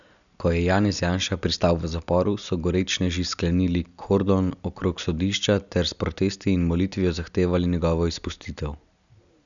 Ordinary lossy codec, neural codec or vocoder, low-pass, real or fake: none; none; 7.2 kHz; real